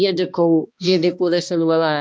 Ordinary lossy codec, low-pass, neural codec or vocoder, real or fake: none; none; codec, 16 kHz, 1 kbps, X-Codec, HuBERT features, trained on balanced general audio; fake